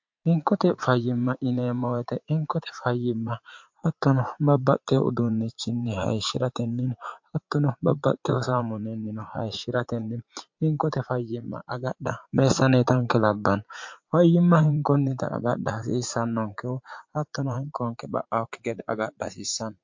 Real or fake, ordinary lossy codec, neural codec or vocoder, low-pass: fake; MP3, 48 kbps; vocoder, 44.1 kHz, 80 mel bands, Vocos; 7.2 kHz